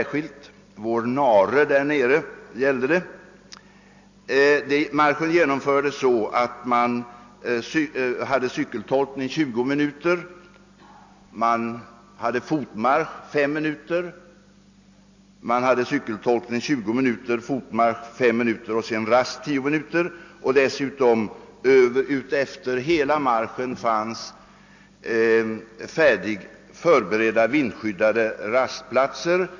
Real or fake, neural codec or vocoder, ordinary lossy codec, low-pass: real; none; AAC, 48 kbps; 7.2 kHz